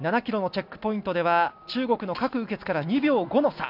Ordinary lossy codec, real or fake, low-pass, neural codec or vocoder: none; real; 5.4 kHz; none